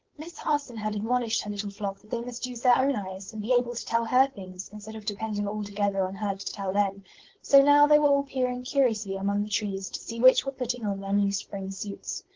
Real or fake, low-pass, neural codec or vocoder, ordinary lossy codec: fake; 7.2 kHz; codec, 16 kHz, 4.8 kbps, FACodec; Opus, 16 kbps